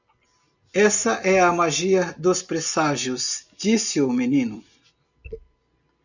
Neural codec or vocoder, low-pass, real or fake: none; 7.2 kHz; real